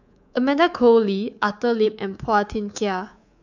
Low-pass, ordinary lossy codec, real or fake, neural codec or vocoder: 7.2 kHz; none; fake; codec, 24 kHz, 3.1 kbps, DualCodec